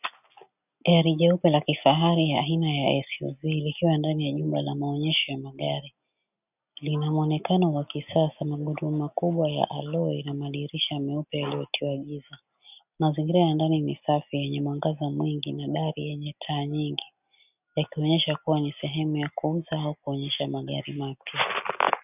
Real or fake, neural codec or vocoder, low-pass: real; none; 3.6 kHz